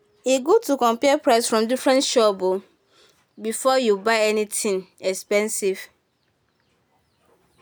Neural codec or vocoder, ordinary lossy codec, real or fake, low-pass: none; none; real; none